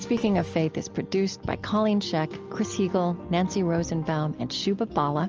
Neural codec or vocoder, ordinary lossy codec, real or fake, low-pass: none; Opus, 24 kbps; real; 7.2 kHz